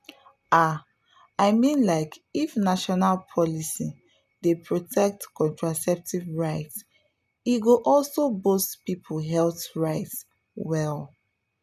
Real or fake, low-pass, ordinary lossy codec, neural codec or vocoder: real; 14.4 kHz; none; none